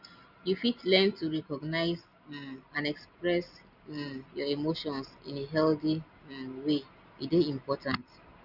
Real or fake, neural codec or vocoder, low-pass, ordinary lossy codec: real; none; 5.4 kHz; none